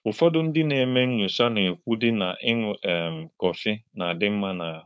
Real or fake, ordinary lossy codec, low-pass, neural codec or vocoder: fake; none; none; codec, 16 kHz, 4.8 kbps, FACodec